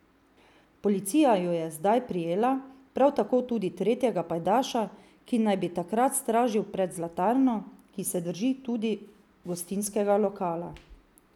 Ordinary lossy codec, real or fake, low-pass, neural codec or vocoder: none; real; 19.8 kHz; none